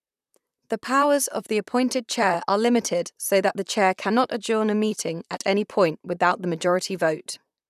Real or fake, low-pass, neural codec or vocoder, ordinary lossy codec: fake; 14.4 kHz; vocoder, 44.1 kHz, 128 mel bands, Pupu-Vocoder; none